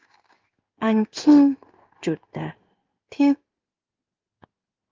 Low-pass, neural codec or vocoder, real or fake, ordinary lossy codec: 7.2 kHz; codec, 16 kHz in and 24 kHz out, 1 kbps, XY-Tokenizer; fake; Opus, 32 kbps